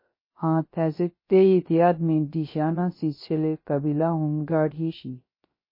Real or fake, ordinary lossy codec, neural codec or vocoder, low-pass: fake; MP3, 24 kbps; codec, 16 kHz, 0.3 kbps, FocalCodec; 5.4 kHz